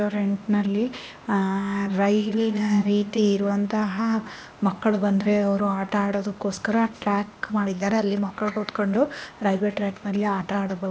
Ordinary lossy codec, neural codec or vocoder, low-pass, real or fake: none; codec, 16 kHz, 0.8 kbps, ZipCodec; none; fake